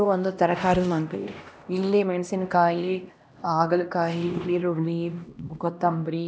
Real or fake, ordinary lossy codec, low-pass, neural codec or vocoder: fake; none; none; codec, 16 kHz, 1 kbps, X-Codec, HuBERT features, trained on LibriSpeech